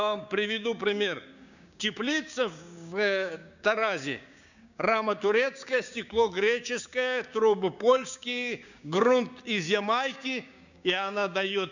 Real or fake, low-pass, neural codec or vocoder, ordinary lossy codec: fake; 7.2 kHz; codec, 16 kHz, 6 kbps, DAC; none